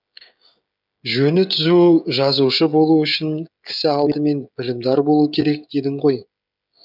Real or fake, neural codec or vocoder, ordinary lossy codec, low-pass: fake; codec, 16 kHz, 16 kbps, FreqCodec, smaller model; none; 5.4 kHz